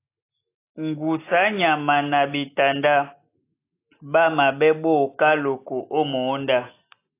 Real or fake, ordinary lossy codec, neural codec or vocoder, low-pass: real; AAC, 24 kbps; none; 3.6 kHz